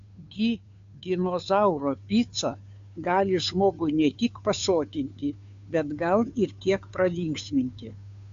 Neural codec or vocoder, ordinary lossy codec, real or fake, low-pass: codec, 16 kHz, 2 kbps, FunCodec, trained on Chinese and English, 25 frames a second; AAC, 64 kbps; fake; 7.2 kHz